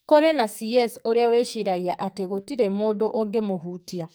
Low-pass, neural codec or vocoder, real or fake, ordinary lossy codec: none; codec, 44.1 kHz, 2.6 kbps, SNAC; fake; none